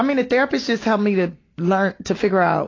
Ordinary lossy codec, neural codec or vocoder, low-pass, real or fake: AAC, 32 kbps; none; 7.2 kHz; real